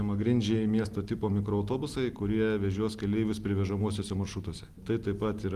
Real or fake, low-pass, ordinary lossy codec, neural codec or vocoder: real; 14.4 kHz; Opus, 24 kbps; none